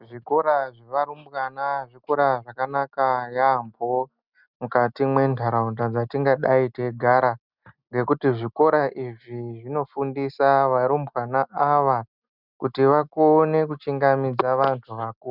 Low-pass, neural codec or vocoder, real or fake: 5.4 kHz; none; real